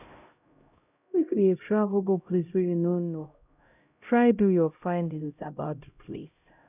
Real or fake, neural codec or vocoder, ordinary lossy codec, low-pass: fake; codec, 16 kHz, 0.5 kbps, X-Codec, HuBERT features, trained on LibriSpeech; none; 3.6 kHz